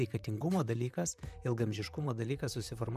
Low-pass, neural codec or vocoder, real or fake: 14.4 kHz; vocoder, 44.1 kHz, 128 mel bands, Pupu-Vocoder; fake